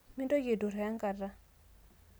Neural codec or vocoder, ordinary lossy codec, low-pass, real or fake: none; none; none; real